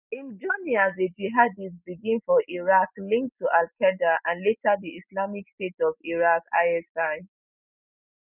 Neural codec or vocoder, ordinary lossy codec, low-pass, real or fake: none; none; 3.6 kHz; real